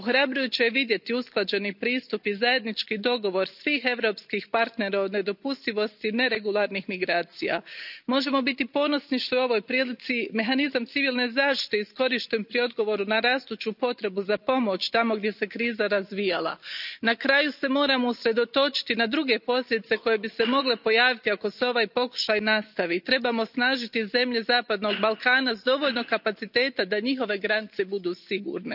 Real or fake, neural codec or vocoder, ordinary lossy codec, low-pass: real; none; none; 5.4 kHz